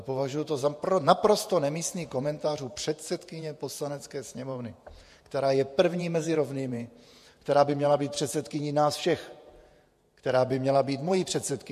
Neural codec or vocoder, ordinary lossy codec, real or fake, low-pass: vocoder, 48 kHz, 128 mel bands, Vocos; MP3, 64 kbps; fake; 14.4 kHz